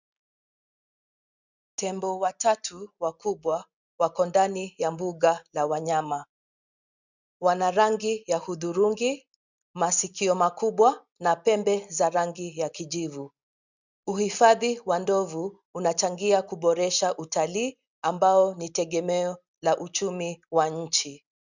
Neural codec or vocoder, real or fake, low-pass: none; real; 7.2 kHz